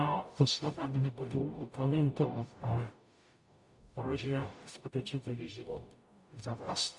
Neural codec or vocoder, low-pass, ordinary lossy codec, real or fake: codec, 44.1 kHz, 0.9 kbps, DAC; 10.8 kHz; AAC, 64 kbps; fake